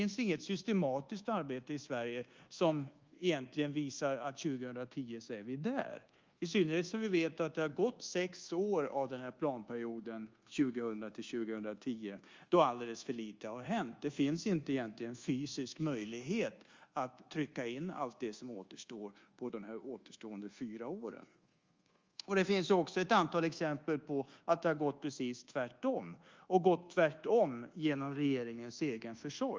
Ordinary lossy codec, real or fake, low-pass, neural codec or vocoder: Opus, 32 kbps; fake; 7.2 kHz; codec, 24 kHz, 1.2 kbps, DualCodec